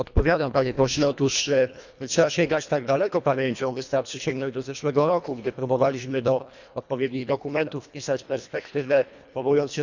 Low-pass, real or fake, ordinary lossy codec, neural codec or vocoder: 7.2 kHz; fake; none; codec, 24 kHz, 1.5 kbps, HILCodec